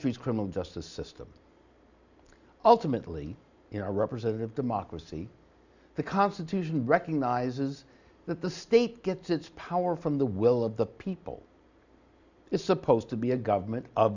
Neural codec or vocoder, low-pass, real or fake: none; 7.2 kHz; real